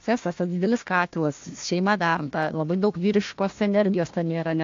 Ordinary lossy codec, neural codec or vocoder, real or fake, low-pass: AAC, 48 kbps; codec, 16 kHz, 1 kbps, FunCodec, trained on Chinese and English, 50 frames a second; fake; 7.2 kHz